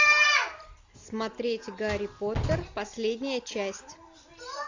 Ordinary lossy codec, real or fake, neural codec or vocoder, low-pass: AAC, 48 kbps; real; none; 7.2 kHz